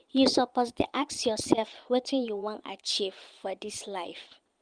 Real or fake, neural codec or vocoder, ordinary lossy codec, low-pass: fake; vocoder, 22.05 kHz, 80 mel bands, Vocos; Opus, 32 kbps; 9.9 kHz